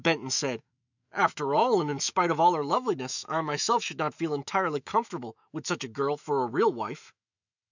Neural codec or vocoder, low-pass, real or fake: autoencoder, 48 kHz, 128 numbers a frame, DAC-VAE, trained on Japanese speech; 7.2 kHz; fake